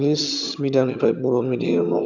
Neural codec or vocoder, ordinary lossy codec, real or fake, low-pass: vocoder, 22.05 kHz, 80 mel bands, HiFi-GAN; none; fake; 7.2 kHz